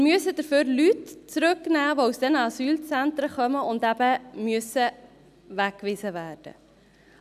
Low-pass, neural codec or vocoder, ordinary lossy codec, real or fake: 14.4 kHz; none; none; real